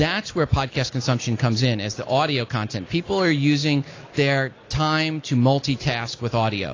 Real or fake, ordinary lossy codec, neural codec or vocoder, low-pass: real; AAC, 32 kbps; none; 7.2 kHz